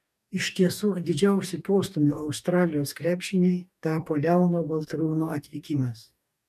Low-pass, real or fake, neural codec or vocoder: 14.4 kHz; fake; codec, 44.1 kHz, 2.6 kbps, DAC